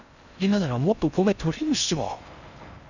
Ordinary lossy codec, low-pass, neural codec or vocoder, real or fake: none; 7.2 kHz; codec, 16 kHz in and 24 kHz out, 0.6 kbps, FocalCodec, streaming, 4096 codes; fake